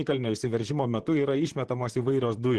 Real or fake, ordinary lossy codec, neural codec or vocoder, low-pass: fake; Opus, 16 kbps; vocoder, 44.1 kHz, 128 mel bands, Pupu-Vocoder; 10.8 kHz